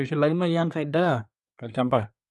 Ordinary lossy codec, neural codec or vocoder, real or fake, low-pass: none; codec, 24 kHz, 1 kbps, SNAC; fake; none